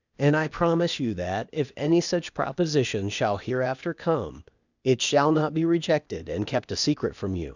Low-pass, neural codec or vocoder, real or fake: 7.2 kHz; codec, 16 kHz, 0.8 kbps, ZipCodec; fake